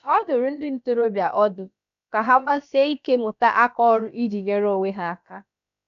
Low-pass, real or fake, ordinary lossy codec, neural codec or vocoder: 7.2 kHz; fake; none; codec, 16 kHz, about 1 kbps, DyCAST, with the encoder's durations